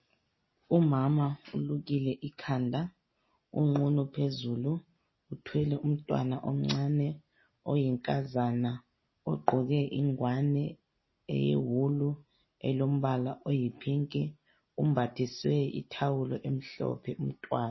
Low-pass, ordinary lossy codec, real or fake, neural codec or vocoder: 7.2 kHz; MP3, 24 kbps; real; none